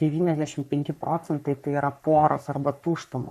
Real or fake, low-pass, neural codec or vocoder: fake; 14.4 kHz; codec, 44.1 kHz, 3.4 kbps, Pupu-Codec